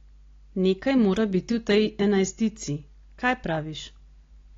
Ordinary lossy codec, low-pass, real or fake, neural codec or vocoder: AAC, 32 kbps; 7.2 kHz; real; none